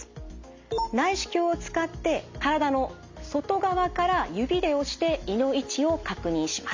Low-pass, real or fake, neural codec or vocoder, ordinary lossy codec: 7.2 kHz; real; none; MP3, 48 kbps